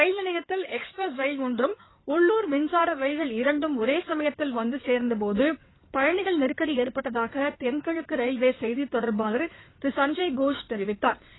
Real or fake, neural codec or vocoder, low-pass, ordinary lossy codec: fake; codec, 16 kHz in and 24 kHz out, 2.2 kbps, FireRedTTS-2 codec; 7.2 kHz; AAC, 16 kbps